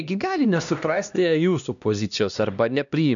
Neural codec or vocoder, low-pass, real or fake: codec, 16 kHz, 1 kbps, X-Codec, HuBERT features, trained on LibriSpeech; 7.2 kHz; fake